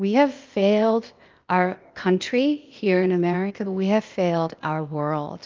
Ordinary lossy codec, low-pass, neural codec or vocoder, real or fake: Opus, 24 kbps; 7.2 kHz; codec, 16 kHz, 0.8 kbps, ZipCodec; fake